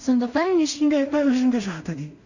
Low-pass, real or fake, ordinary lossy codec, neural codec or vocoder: 7.2 kHz; fake; none; codec, 16 kHz in and 24 kHz out, 0.4 kbps, LongCat-Audio-Codec, two codebook decoder